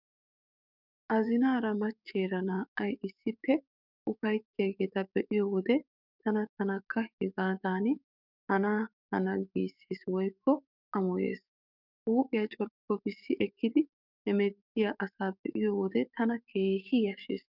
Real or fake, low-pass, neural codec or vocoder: fake; 5.4 kHz; codec, 44.1 kHz, 7.8 kbps, DAC